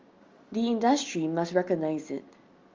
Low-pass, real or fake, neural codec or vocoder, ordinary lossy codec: 7.2 kHz; real; none; Opus, 32 kbps